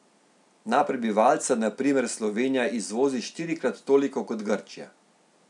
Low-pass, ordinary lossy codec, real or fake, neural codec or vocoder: 10.8 kHz; none; real; none